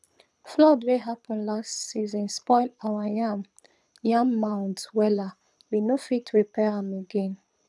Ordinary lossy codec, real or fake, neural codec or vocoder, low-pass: none; fake; codec, 24 kHz, 6 kbps, HILCodec; none